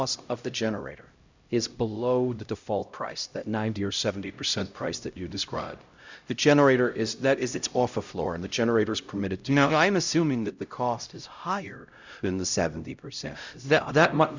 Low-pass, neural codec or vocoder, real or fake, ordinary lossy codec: 7.2 kHz; codec, 16 kHz, 0.5 kbps, X-Codec, HuBERT features, trained on LibriSpeech; fake; Opus, 64 kbps